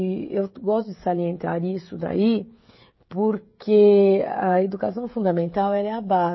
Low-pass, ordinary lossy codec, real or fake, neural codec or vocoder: 7.2 kHz; MP3, 24 kbps; fake; codec, 16 kHz, 16 kbps, FreqCodec, smaller model